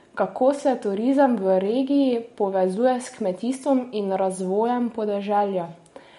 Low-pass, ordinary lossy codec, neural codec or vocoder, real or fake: 10.8 kHz; MP3, 48 kbps; none; real